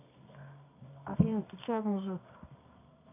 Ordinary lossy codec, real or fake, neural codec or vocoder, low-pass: AAC, 32 kbps; fake; codec, 24 kHz, 0.9 kbps, WavTokenizer, medium music audio release; 3.6 kHz